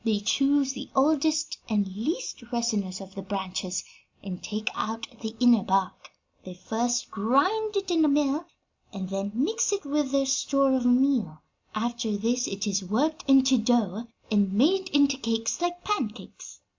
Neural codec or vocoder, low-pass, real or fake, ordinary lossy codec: none; 7.2 kHz; real; MP3, 64 kbps